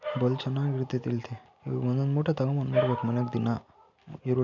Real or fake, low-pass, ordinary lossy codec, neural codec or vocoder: real; 7.2 kHz; none; none